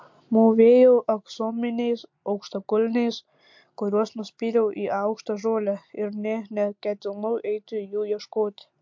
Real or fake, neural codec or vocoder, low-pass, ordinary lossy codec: real; none; 7.2 kHz; MP3, 48 kbps